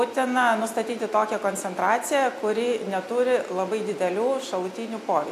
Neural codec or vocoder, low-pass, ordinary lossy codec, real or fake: none; 14.4 kHz; AAC, 64 kbps; real